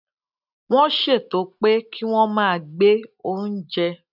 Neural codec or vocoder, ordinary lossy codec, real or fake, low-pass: none; none; real; 5.4 kHz